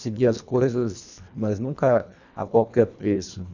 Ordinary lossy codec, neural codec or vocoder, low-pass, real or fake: none; codec, 24 kHz, 1.5 kbps, HILCodec; 7.2 kHz; fake